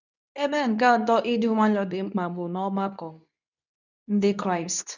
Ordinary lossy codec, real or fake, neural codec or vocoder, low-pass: none; fake; codec, 24 kHz, 0.9 kbps, WavTokenizer, medium speech release version 2; 7.2 kHz